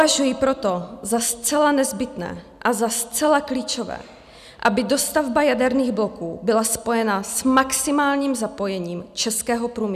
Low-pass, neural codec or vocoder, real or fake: 14.4 kHz; none; real